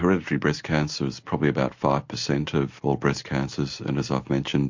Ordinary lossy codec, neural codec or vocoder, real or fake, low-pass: MP3, 48 kbps; none; real; 7.2 kHz